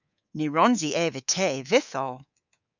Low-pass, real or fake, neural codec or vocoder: 7.2 kHz; fake; codec, 24 kHz, 3.1 kbps, DualCodec